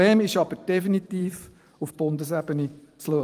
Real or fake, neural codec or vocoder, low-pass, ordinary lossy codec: fake; vocoder, 44.1 kHz, 128 mel bands every 512 samples, BigVGAN v2; 14.4 kHz; Opus, 32 kbps